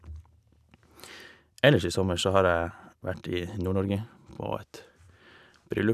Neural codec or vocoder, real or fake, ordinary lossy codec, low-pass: none; real; none; 14.4 kHz